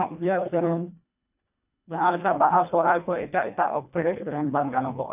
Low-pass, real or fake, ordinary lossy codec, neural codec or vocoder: 3.6 kHz; fake; none; codec, 24 kHz, 1.5 kbps, HILCodec